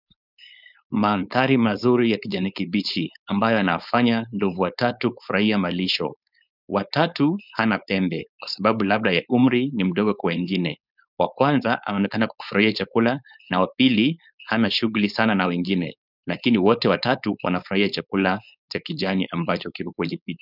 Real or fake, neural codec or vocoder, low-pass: fake; codec, 16 kHz, 4.8 kbps, FACodec; 5.4 kHz